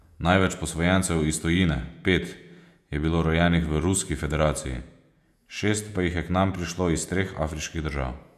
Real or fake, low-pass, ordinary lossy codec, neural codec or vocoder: fake; 14.4 kHz; none; vocoder, 48 kHz, 128 mel bands, Vocos